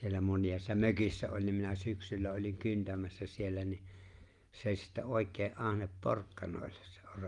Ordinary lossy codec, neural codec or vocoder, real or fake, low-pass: Opus, 32 kbps; none; real; 10.8 kHz